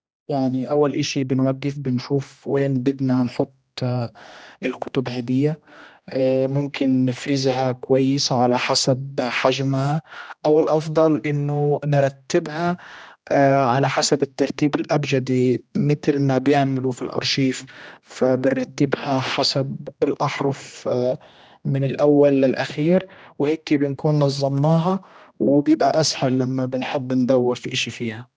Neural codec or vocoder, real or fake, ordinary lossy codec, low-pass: codec, 16 kHz, 1 kbps, X-Codec, HuBERT features, trained on general audio; fake; none; none